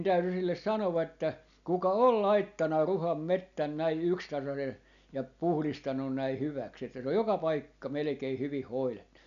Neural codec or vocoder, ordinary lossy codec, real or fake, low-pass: none; none; real; 7.2 kHz